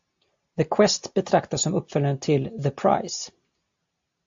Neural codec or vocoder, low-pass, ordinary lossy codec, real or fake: none; 7.2 kHz; AAC, 64 kbps; real